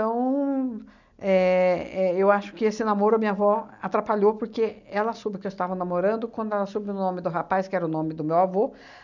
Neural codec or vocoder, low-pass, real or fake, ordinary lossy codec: none; 7.2 kHz; real; none